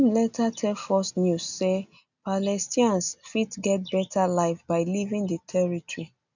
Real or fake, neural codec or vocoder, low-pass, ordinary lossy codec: real; none; 7.2 kHz; none